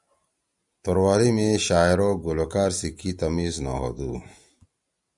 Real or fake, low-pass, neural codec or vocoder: real; 10.8 kHz; none